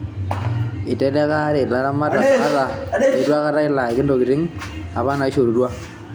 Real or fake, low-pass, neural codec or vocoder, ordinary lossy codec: real; none; none; none